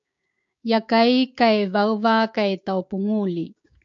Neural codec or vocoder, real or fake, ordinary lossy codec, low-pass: codec, 16 kHz, 6 kbps, DAC; fake; AAC, 64 kbps; 7.2 kHz